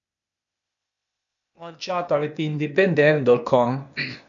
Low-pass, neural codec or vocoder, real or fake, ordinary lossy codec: 7.2 kHz; codec, 16 kHz, 0.8 kbps, ZipCodec; fake; none